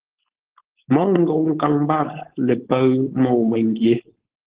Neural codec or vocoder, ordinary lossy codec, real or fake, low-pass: codec, 16 kHz, 4.8 kbps, FACodec; Opus, 16 kbps; fake; 3.6 kHz